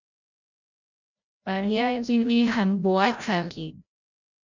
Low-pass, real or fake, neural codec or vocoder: 7.2 kHz; fake; codec, 16 kHz, 0.5 kbps, FreqCodec, larger model